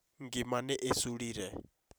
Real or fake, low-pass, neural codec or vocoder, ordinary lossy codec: fake; none; vocoder, 44.1 kHz, 128 mel bands every 256 samples, BigVGAN v2; none